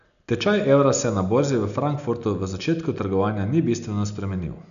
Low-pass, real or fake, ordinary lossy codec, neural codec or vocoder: 7.2 kHz; real; none; none